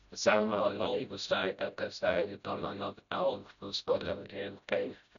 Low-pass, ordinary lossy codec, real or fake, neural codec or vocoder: 7.2 kHz; none; fake; codec, 16 kHz, 0.5 kbps, FreqCodec, smaller model